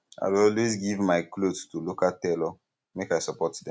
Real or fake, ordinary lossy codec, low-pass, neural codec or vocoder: real; none; none; none